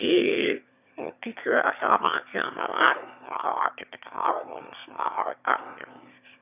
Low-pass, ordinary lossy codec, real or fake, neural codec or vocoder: 3.6 kHz; none; fake; autoencoder, 22.05 kHz, a latent of 192 numbers a frame, VITS, trained on one speaker